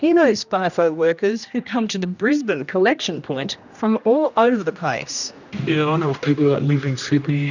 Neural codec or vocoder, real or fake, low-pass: codec, 16 kHz, 1 kbps, X-Codec, HuBERT features, trained on general audio; fake; 7.2 kHz